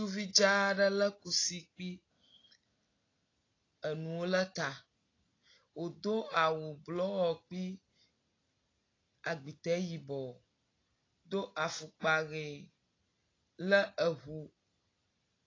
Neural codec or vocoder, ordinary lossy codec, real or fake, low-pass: none; AAC, 32 kbps; real; 7.2 kHz